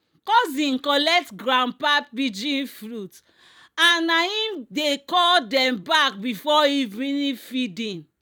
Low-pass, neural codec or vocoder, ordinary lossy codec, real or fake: 19.8 kHz; vocoder, 44.1 kHz, 128 mel bands every 256 samples, BigVGAN v2; none; fake